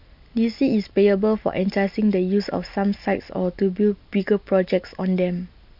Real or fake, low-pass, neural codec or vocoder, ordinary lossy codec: real; 5.4 kHz; none; MP3, 48 kbps